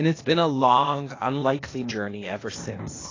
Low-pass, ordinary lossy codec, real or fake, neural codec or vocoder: 7.2 kHz; AAC, 32 kbps; fake; codec, 16 kHz, 0.8 kbps, ZipCodec